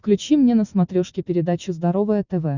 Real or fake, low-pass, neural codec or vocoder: real; 7.2 kHz; none